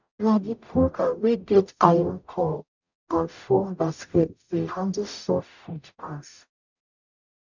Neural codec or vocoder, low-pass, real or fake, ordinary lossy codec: codec, 44.1 kHz, 0.9 kbps, DAC; 7.2 kHz; fake; none